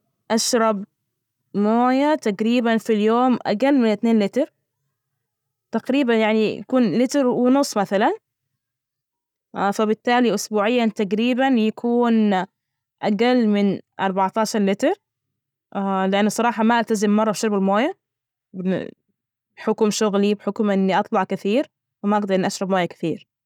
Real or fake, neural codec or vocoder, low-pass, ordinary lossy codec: real; none; 19.8 kHz; none